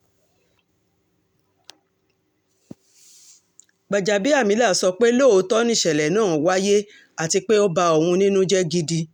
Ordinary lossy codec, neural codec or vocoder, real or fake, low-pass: none; none; real; 19.8 kHz